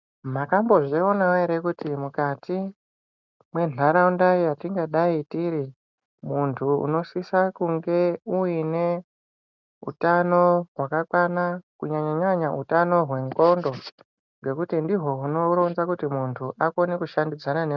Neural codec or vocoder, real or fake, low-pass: none; real; 7.2 kHz